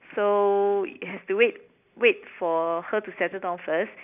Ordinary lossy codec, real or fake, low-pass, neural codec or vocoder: none; real; 3.6 kHz; none